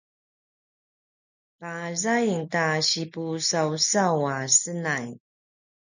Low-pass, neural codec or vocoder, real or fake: 7.2 kHz; none; real